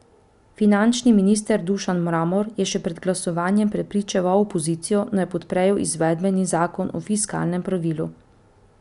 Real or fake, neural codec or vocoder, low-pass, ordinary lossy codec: real; none; 10.8 kHz; none